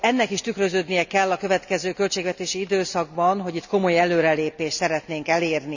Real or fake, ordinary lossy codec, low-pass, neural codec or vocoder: real; none; 7.2 kHz; none